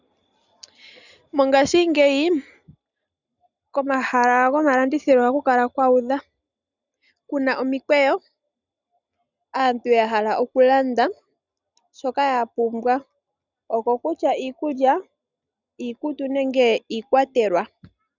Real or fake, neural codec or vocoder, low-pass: real; none; 7.2 kHz